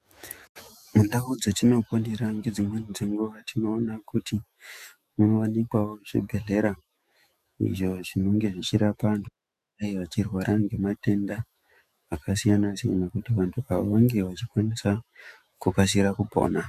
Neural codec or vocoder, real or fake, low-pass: autoencoder, 48 kHz, 128 numbers a frame, DAC-VAE, trained on Japanese speech; fake; 14.4 kHz